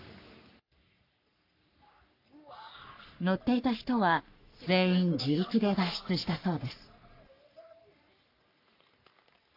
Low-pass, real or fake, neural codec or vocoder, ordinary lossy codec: 5.4 kHz; fake; codec, 44.1 kHz, 3.4 kbps, Pupu-Codec; AAC, 32 kbps